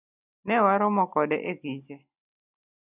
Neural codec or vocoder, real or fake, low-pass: none; real; 3.6 kHz